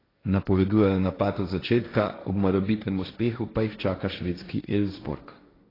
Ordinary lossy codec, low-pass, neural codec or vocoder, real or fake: AAC, 24 kbps; 5.4 kHz; codec, 16 kHz, 1.1 kbps, Voila-Tokenizer; fake